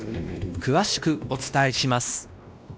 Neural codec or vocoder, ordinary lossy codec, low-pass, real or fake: codec, 16 kHz, 1 kbps, X-Codec, WavLM features, trained on Multilingual LibriSpeech; none; none; fake